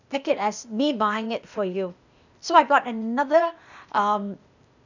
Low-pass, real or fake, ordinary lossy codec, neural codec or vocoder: 7.2 kHz; fake; none; codec, 16 kHz, 0.8 kbps, ZipCodec